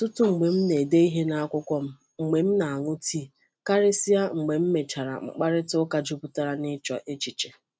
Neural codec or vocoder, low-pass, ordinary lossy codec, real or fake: none; none; none; real